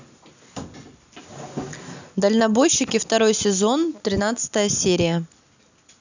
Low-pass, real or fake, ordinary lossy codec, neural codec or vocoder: 7.2 kHz; real; none; none